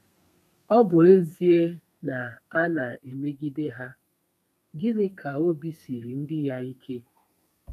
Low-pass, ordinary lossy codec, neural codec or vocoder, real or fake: 14.4 kHz; none; codec, 32 kHz, 1.9 kbps, SNAC; fake